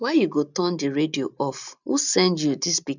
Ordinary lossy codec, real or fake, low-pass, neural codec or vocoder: none; real; 7.2 kHz; none